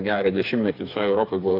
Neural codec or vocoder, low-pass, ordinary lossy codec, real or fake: codec, 16 kHz, 4 kbps, FreqCodec, smaller model; 5.4 kHz; AAC, 32 kbps; fake